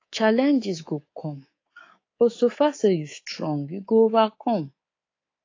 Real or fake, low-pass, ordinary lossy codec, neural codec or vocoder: fake; 7.2 kHz; AAC, 32 kbps; codec, 24 kHz, 3.1 kbps, DualCodec